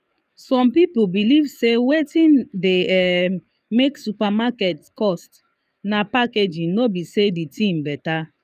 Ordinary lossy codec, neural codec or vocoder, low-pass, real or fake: none; codec, 44.1 kHz, 7.8 kbps, DAC; 14.4 kHz; fake